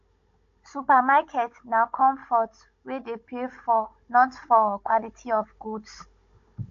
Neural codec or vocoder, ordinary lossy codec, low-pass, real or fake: codec, 16 kHz, 16 kbps, FunCodec, trained on Chinese and English, 50 frames a second; AAC, 48 kbps; 7.2 kHz; fake